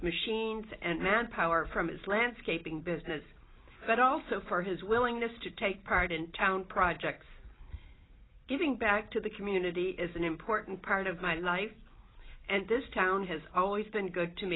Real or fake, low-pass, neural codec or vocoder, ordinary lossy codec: real; 7.2 kHz; none; AAC, 16 kbps